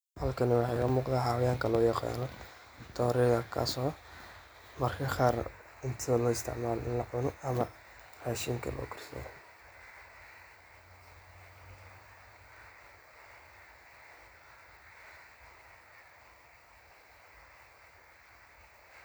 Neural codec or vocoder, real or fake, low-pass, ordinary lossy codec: none; real; none; none